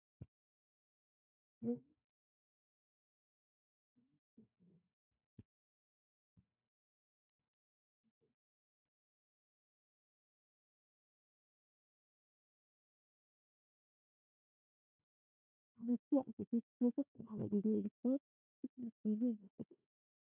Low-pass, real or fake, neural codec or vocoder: 3.6 kHz; fake; codec, 16 kHz, 1 kbps, FunCodec, trained on Chinese and English, 50 frames a second